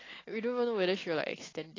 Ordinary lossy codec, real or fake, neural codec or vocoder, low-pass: AAC, 32 kbps; real; none; 7.2 kHz